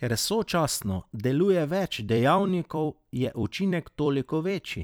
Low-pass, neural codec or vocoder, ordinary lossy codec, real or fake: none; vocoder, 44.1 kHz, 128 mel bands every 256 samples, BigVGAN v2; none; fake